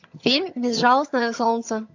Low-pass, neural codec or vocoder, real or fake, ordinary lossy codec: 7.2 kHz; vocoder, 22.05 kHz, 80 mel bands, HiFi-GAN; fake; AAC, 48 kbps